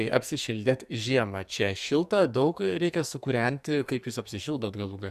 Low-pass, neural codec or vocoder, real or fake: 14.4 kHz; codec, 44.1 kHz, 2.6 kbps, SNAC; fake